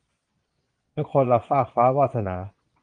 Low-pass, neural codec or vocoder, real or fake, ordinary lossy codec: 9.9 kHz; vocoder, 22.05 kHz, 80 mel bands, Vocos; fake; Opus, 32 kbps